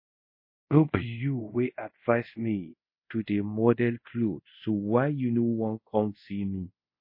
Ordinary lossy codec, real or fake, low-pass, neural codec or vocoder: MP3, 24 kbps; fake; 5.4 kHz; codec, 24 kHz, 0.5 kbps, DualCodec